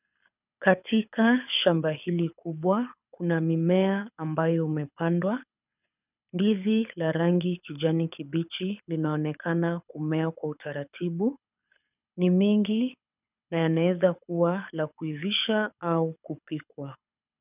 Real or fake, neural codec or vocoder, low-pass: fake; codec, 24 kHz, 6 kbps, HILCodec; 3.6 kHz